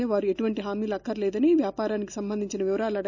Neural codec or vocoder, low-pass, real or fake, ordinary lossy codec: none; 7.2 kHz; real; none